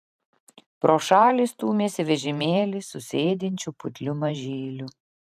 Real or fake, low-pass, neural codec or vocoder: fake; 14.4 kHz; vocoder, 44.1 kHz, 128 mel bands every 512 samples, BigVGAN v2